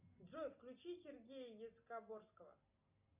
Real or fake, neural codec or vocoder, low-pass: real; none; 3.6 kHz